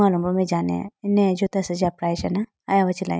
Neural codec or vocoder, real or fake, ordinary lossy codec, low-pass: none; real; none; none